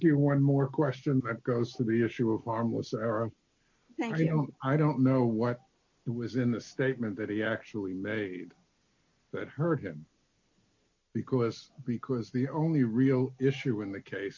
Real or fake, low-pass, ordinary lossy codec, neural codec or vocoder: real; 7.2 kHz; MP3, 48 kbps; none